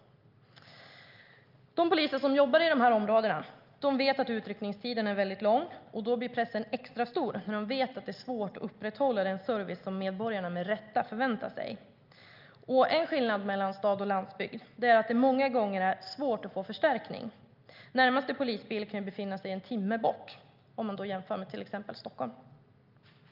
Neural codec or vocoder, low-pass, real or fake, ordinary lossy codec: none; 5.4 kHz; real; Opus, 24 kbps